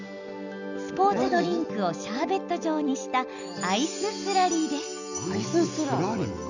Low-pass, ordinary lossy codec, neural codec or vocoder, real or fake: 7.2 kHz; none; none; real